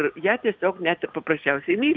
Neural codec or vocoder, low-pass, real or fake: none; 7.2 kHz; real